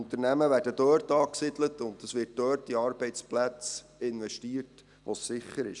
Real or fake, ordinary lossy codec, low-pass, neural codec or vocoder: real; none; 10.8 kHz; none